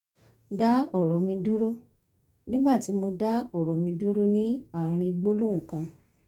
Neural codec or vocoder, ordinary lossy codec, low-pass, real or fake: codec, 44.1 kHz, 2.6 kbps, DAC; MP3, 96 kbps; 19.8 kHz; fake